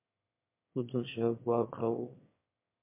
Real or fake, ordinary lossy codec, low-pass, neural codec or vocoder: fake; MP3, 24 kbps; 3.6 kHz; autoencoder, 22.05 kHz, a latent of 192 numbers a frame, VITS, trained on one speaker